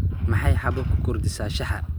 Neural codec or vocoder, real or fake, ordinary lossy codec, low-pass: none; real; none; none